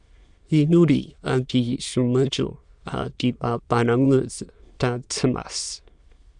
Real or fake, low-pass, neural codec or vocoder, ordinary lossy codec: fake; 9.9 kHz; autoencoder, 22.05 kHz, a latent of 192 numbers a frame, VITS, trained on many speakers; Opus, 64 kbps